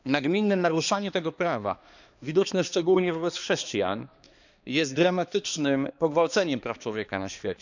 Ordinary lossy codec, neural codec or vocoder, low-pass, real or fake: none; codec, 16 kHz, 2 kbps, X-Codec, HuBERT features, trained on balanced general audio; 7.2 kHz; fake